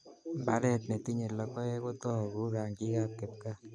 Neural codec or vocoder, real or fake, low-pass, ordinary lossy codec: none; real; 9.9 kHz; MP3, 96 kbps